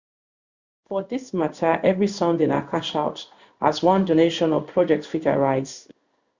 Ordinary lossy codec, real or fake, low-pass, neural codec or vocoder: Opus, 64 kbps; fake; 7.2 kHz; codec, 16 kHz in and 24 kHz out, 1 kbps, XY-Tokenizer